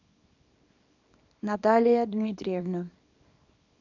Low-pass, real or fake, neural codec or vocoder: 7.2 kHz; fake; codec, 24 kHz, 0.9 kbps, WavTokenizer, small release